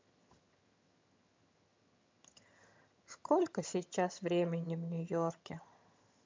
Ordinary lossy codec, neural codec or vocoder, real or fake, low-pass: MP3, 64 kbps; vocoder, 22.05 kHz, 80 mel bands, HiFi-GAN; fake; 7.2 kHz